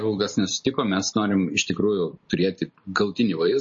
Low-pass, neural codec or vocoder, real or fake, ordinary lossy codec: 7.2 kHz; none; real; MP3, 32 kbps